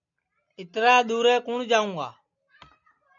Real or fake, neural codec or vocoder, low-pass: real; none; 7.2 kHz